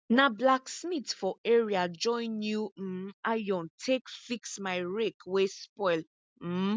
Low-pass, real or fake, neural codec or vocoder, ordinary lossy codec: none; real; none; none